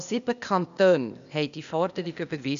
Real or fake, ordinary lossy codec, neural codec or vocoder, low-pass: fake; MP3, 96 kbps; codec, 16 kHz, 0.8 kbps, ZipCodec; 7.2 kHz